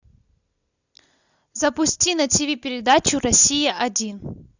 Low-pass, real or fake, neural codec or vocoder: 7.2 kHz; real; none